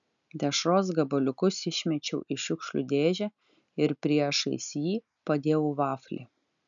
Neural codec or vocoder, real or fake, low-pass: none; real; 7.2 kHz